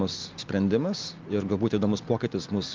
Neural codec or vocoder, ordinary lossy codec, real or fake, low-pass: none; Opus, 32 kbps; real; 7.2 kHz